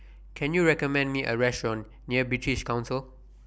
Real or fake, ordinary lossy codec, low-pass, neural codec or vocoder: real; none; none; none